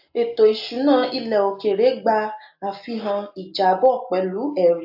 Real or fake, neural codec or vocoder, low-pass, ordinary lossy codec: real; none; 5.4 kHz; AAC, 48 kbps